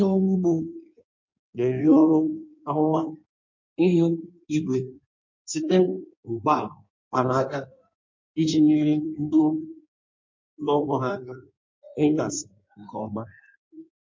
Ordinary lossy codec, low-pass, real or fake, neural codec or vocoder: MP3, 48 kbps; 7.2 kHz; fake; codec, 16 kHz in and 24 kHz out, 1.1 kbps, FireRedTTS-2 codec